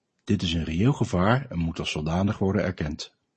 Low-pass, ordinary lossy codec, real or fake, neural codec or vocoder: 10.8 kHz; MP3, 32 kbps; real; none